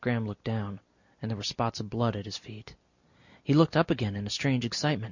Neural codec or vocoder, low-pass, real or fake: none; 7.2 kHz; real